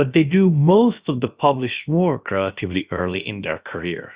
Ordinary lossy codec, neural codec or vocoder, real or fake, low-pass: Opus, 64 kbps; codec, 16 kHz, about 1 kbps, DyCAST, with the encoder's durations; fake; 3.6 kHz